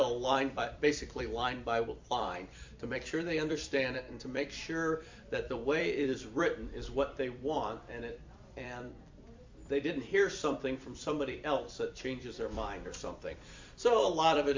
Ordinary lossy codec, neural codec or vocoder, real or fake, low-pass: MP3, 48 kbps; vocoder, 44.1 kHz, 128 mel bands every 256 samples, BigVGAN v2; fake; 7.2 kHz